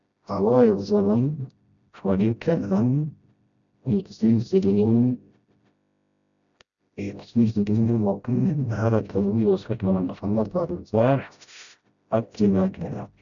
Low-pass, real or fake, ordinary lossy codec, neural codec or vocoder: 7.2 kHz; fake; none; codec, 16 kHz, 0.5 kbps, FreqCodec, smaller model